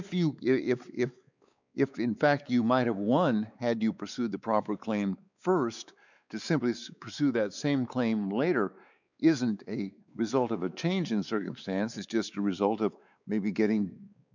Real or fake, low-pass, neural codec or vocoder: fake; 7.2 kHz; codec, 16 kHz, 4 kbps, X-Codec, WavLM features, trained on Multilingual LibriSpeech